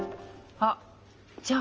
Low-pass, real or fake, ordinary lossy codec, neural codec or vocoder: 7.2 kHz; real; Opus, 24 kbps; none